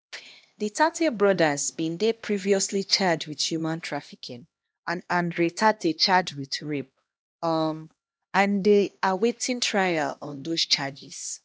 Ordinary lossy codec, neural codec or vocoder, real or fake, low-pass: none; codec, 16 kHz, 1 kbps, X-Codec, HuBERT features, trained on LibriSpeech; fake; none